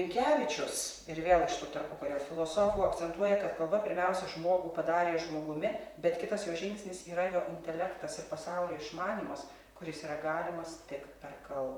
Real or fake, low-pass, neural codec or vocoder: fake; 19.8 kHz; vocoder, 44.1 kHz, 128 mel bands, Pupu-Vocoder